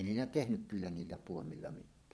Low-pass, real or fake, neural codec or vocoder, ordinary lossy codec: none; fake; vocoder, 22.05 kHz, 80 mel bands, Vocos; none